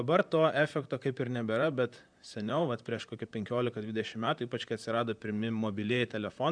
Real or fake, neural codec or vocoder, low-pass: fake; vocoder, 44.1 kHz, 128 mel bands, Pupu-Vocoder; 9.9 kHz